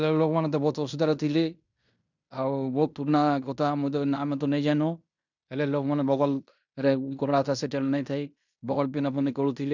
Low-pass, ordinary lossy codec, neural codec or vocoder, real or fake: 7.2 kHz; none; codec, 16 kHz in and 24 kHz out, 0.9 kbps, LongCat-Audio-Codec, fine tuned four codebook decoder; fake